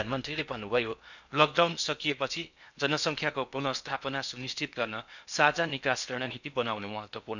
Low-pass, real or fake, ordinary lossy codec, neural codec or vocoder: 7.2 kHz; fake; none; codec, 16 kHz in and 24 kHz out, 0.8 kbps, FocalCodec, streaming, 65536 codes